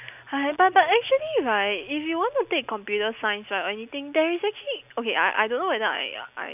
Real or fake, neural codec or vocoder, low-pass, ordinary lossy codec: real; none; 3.6 kHz; none